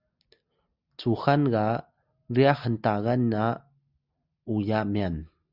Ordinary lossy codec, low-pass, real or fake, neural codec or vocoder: Opus, 64 kbps; 5.4 kHz; real; none